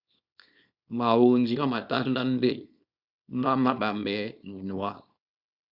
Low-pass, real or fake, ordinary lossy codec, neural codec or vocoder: 5.4 kHz; fake; Opus, 64 kbps; codec, 24 kHz, 0.9 kbps, WavTokenizer, small release